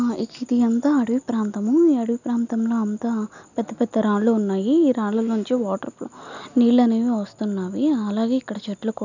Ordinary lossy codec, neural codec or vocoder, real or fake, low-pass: none; none; real; 7.2 kHz